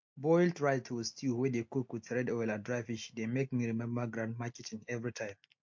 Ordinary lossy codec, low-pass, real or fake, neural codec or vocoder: MP3, 48 kbps; 7.2 kHz; real; none